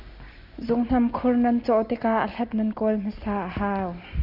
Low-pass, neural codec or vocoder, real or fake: 5.4 kHz; none; real